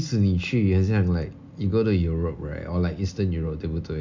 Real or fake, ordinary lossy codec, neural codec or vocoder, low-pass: real; MP3, 64 kbps; none; 7.2 kHz